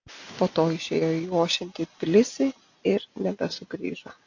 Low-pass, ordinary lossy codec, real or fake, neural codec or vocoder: 7.2 kHz; AAC, 48 kbps; real; none